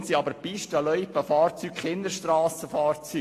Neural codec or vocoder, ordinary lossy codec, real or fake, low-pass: none; AAC, 48 kbps; real; 14.4 kHz